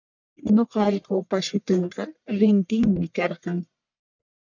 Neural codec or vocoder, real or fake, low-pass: codec, 44.1 kHz, 1.7 kbps, Pupu-Codec; fake; 7.2 kHz